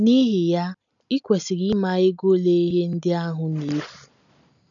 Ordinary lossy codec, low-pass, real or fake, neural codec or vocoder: none; 7.2 kHz; real; none